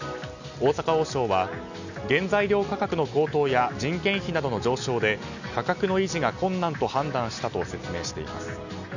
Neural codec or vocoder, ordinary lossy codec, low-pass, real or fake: none; none; 7.2 kHz; real